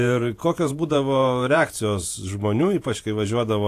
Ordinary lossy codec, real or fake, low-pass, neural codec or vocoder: AAC, 64 kbps; fake; 14.4 kHz; vocoder, 48 kHz, 128 mel bands, Vocos